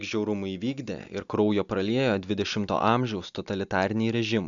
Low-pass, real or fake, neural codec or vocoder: 7.2 kHz; real; none